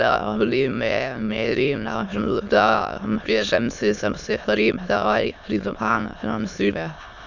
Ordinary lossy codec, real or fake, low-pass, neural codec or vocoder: none; fake; 7.2 kHz; autoencoder, 22.05 kHz, a latent of 192 numbers a frame, VITS, trained on many speakers